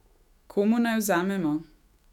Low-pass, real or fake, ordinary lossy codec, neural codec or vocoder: 19.8 kHz; fake; none; autoencoder, 48 kHz, 128 numbers a frame, DAC-VAE, trained on Japanese speech